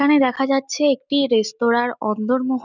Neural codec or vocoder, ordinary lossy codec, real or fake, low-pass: none; none; real; 7.2 kHz